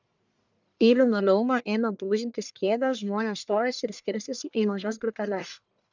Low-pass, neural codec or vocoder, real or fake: 7.2 kHz; codec, 44.1 kHz, 1.7 kbps, Pupu-Codec; fake